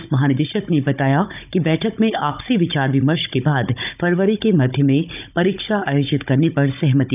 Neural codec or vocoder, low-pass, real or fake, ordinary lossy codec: codec, 16 kHz, 16 kbps, FunCodec, trained on Chinese and English, 50 frames a second; 3.6 kHz; fake; none